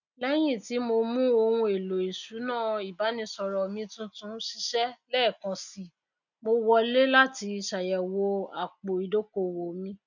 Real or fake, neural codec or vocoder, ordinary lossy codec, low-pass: real; none; none; 7.2 kHz